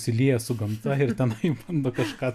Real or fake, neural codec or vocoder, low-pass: real; none; 14.4 kHz